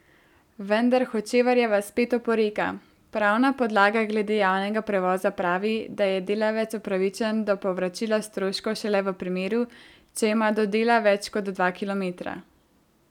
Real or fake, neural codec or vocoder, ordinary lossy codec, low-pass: real; none; none; 19.8 kHz